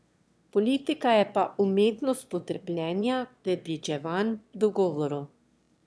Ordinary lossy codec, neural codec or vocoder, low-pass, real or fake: none; autoencoder, 22.05 kHz, a latent of 192 numbers a frame, VITS, trained on one speaker; none; fake